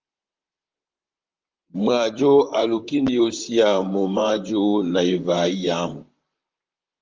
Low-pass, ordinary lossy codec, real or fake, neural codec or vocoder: 7.2 kHz; Opus, 24 kbps; fake; vocoder, 44.1 kHz, 128 mel bands, Pupu-Vocoder